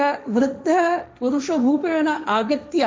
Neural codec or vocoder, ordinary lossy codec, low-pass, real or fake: codec, 16 kHz, 1.1 kbps, Voila-Tokenizer; none; none; fake